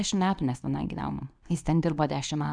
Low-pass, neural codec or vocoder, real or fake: 9.9 kHz; codec, 24 kHz, 0.9 kbps, WavTokenizer, medium speech release version 2; fake